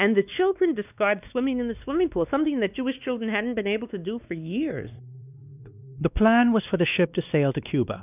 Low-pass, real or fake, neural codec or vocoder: 3.6 kHz; fake; codec, 16 kHz, 2 kbps, X-Codec, WavLM features, trained on Multilingual LibriSpeech